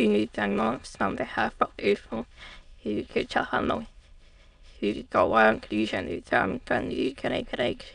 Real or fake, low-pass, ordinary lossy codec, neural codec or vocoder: fake; 9.9 kHz; none; autoencoder, 22.05 kHz, a latent of 192 numbers a frame, VITS, trained on many speakers